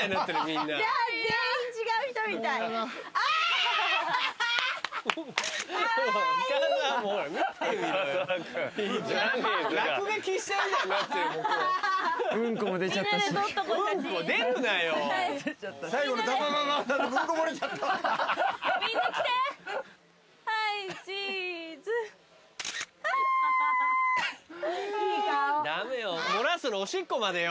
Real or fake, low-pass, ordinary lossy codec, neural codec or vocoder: real; none; none; none